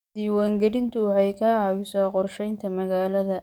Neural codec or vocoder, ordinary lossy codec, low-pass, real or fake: codec, 44.1 kHz, 7.8 kbps, DAC; none; 19.8 kHz; fake